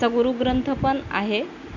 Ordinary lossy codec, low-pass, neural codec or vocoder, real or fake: none; 7.2 kHz; none; real